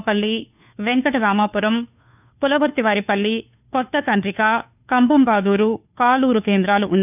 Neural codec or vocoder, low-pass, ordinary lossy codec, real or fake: codec, 16 kHz, 2 kbps, FunCodec, trained on Chinese and English, 25 frames a second; 3.6 kHz; none; fake